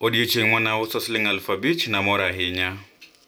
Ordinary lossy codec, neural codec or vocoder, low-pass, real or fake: none; none; none; real